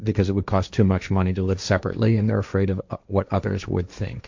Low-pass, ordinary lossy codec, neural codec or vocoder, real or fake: 7.2 kHz; MP3, 64 kbps; codec, 16 kHz, 1.1 kbps, Voila-Tokenizer; fake